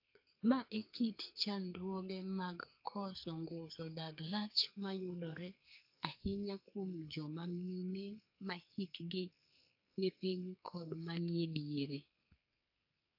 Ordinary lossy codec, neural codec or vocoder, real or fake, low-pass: AAC, 48 kbps; codec, 44.1 kHz, 2.6 kbps, SNAC; fake; 5.4 kHz